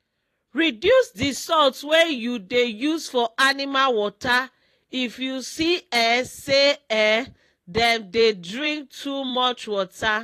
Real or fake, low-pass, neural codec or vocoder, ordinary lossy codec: fake; 14.4 kHz; vocoder, 44.1 kHz, 128 mel bands every 256 samples, BigVGAN v2; AAC, 48 kbps